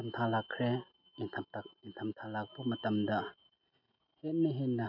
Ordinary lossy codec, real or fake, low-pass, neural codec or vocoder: none; real; 5.4 kHz; none